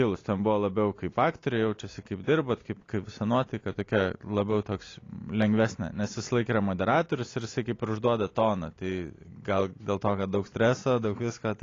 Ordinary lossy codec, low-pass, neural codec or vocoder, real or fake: AAC, 32 kbps; 7.2 kHz; none; real